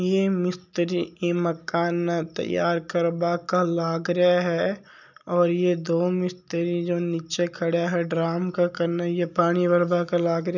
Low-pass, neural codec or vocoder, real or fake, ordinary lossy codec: 7.2 kHz; none; real; none